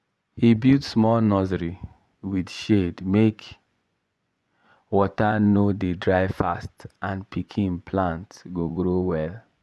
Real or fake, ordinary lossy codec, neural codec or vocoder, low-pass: fake; none; vocoder, 24 kHz, 100 mel bands, Vocos; none